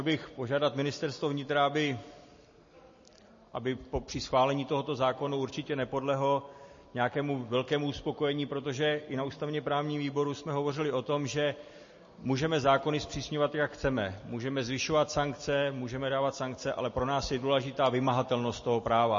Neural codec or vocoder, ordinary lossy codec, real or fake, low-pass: none; MP3, 32 kbps; real; 7.2 kHz